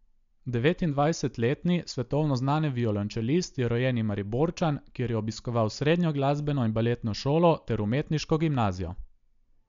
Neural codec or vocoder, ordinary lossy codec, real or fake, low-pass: none; MP3, 64 kbps; real; 7.2 kHz